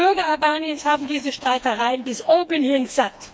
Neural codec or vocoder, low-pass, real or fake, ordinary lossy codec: codec, 16 kHz, 2 kbps, FreqCodec, smaller model; none; fake; none